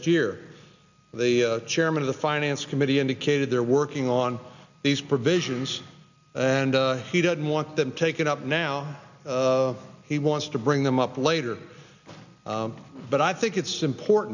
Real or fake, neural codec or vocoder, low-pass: real; none; 7.2 kHz